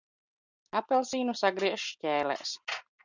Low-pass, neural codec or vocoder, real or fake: 7.2 kHz; none; real